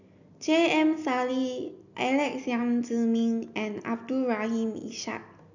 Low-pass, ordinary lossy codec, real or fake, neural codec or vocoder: 7.2 kHz; none; real; none